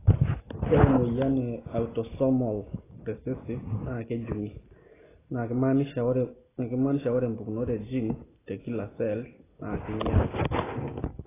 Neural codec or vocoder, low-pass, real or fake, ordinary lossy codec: none; 3.6 kHz; real; AAC, 16 kbps